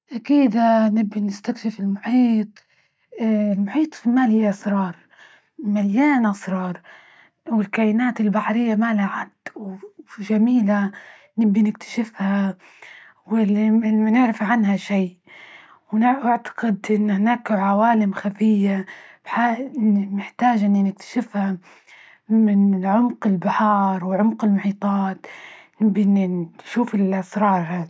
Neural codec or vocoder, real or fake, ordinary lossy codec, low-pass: none; real; none; none